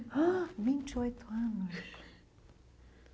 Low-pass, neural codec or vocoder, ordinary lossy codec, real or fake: none; none; none; real